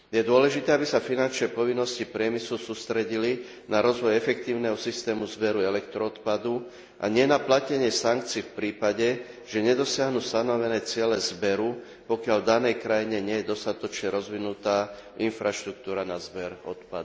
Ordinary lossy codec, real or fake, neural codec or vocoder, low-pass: none; real; none; none